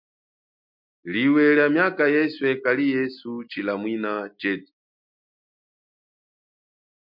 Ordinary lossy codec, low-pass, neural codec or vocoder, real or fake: MP3, 48 kbps; 5.4 kHz; none; real